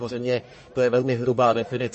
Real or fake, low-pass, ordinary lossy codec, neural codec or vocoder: fake; 10.8 kHz; MP3, 32 kbps; codec, 44.1 kHz, 1.7 kbps, Pupu-Codec